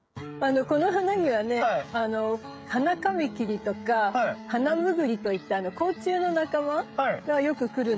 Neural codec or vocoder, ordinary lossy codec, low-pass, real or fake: codec, 16 kHz, 16 kbps, FreqCodec, smaller model; none; none; fake